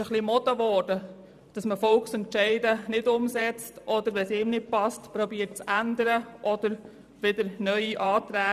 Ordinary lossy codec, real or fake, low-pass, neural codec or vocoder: none; fake; 14.4 kHz; vocoder, 44.1 kHz, 128 mel bands every 512 samples, BigVGAN v2